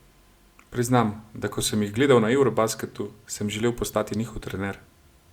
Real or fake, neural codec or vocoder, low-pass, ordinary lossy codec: real; none; 19.8 kHz; Opus, 64 kbps